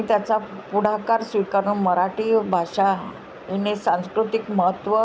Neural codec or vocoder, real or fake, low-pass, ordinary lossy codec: none; real; none; none